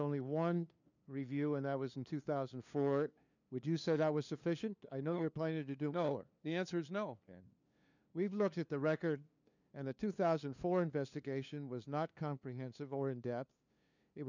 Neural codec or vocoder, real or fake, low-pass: codec, 16 kHz, 2 kbps, FunCodec, trained on LibriTTS, 25 frames a second; fake; 7.2 kHz